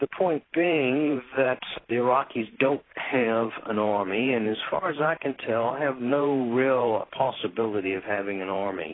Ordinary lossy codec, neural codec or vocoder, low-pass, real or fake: AAC, 16 kbps; vocoder, 44.1 kHz, 128 mel bands every 512 samples, BigVGAN v2; 7.2 kHz; fake